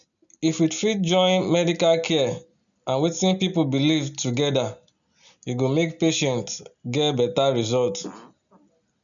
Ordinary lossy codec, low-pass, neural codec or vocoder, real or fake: none; 7.2 kHz; none; real